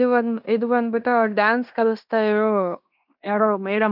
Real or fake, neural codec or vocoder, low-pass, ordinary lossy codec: fake; codec, 16 kHz in and 24 kHz out, 0.9 kbps, LongCat-Audio-Codec, fine tuned four codebook decoder; 5.4 kHz; none